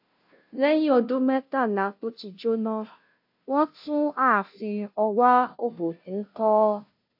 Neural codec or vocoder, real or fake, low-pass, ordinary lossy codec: codec, 16 kHz, 0.5 kbps, FunCodec, trained on Chinese and English, 25 frames a second; fake; 5.4 kHz; AAC, 48 kbps